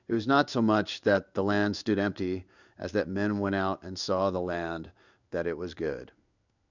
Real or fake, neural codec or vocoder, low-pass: fake; codec, 16 kHz in and 24 kHz out, 1 kbps, XY-Tokenizer; 7.2 kHz